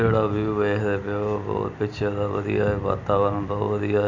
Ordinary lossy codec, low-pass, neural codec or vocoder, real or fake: none; 7.2 kHz; none; real